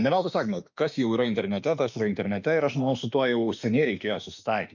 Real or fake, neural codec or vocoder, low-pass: fake; autoencoder, 48 kHz, 32 numbers a frame, DAC-VAE, trained on Japanese speech; 7.2 kHz